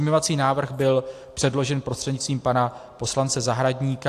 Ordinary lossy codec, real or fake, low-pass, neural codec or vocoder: AAC, 64 kbps; real; 14.4 kHz; none